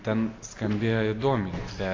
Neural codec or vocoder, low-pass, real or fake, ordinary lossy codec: none; 7.2 kHz; real; AAC, 32 kbps